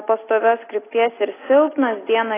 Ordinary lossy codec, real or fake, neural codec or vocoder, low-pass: AAC, 16 kbps; real; none; 3.6 kHz